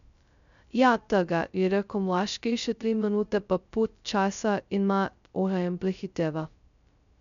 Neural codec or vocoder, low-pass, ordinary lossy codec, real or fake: codec, 16 kHz, 0.2 kbps, FocalCodec; 7.2 kHz; none; fake